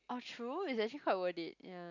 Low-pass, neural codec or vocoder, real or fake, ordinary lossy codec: 7.2 kHz; none; real; none